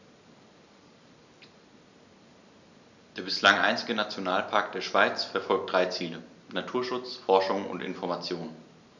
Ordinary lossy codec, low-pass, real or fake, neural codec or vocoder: none; 7.2 kHz; real; none